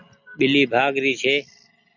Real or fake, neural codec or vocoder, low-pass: real; none; 7.2 kHz